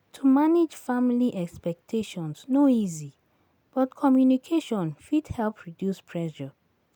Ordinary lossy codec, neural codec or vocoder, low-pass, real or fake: none; none; none; real